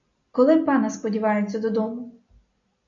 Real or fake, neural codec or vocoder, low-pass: real; none; 7.2 kHz